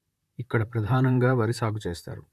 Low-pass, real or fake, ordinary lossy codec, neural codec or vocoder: 14.4 kHz; fake; AAC, 96 kbps; vocoder, 44.1 kHz, 128 mel bands, Pupu-Vocoder